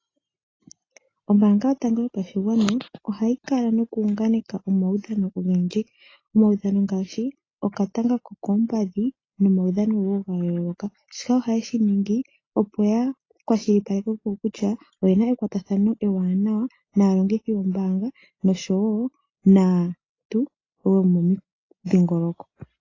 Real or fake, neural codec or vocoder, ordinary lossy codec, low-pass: real; none; AAC, 32 kbps; 7.2 kHz